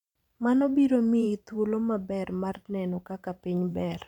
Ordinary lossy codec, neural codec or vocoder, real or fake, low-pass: none; vocoder, 44.1 kHz, 128 mel bands every 512 samples, BigVGAN v2; fake; 19.8 kHz